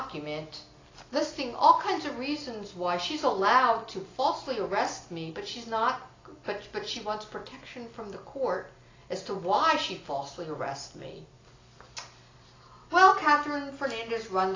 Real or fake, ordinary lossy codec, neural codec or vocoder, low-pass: real; AAC, 32 kbps; none; 7.2 kHz